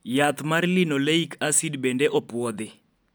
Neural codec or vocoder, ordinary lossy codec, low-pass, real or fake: none; none; none; real